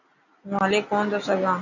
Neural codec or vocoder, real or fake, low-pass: none; real; 7.2 kHz